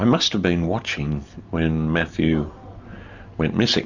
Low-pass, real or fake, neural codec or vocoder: 7.2 kHz; real; none